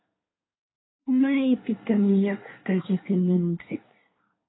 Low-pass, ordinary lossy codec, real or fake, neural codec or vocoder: 7.2 kHz; AAC, 16 kbps; fake; codec, 24 kHz, 1 kbps, SNAC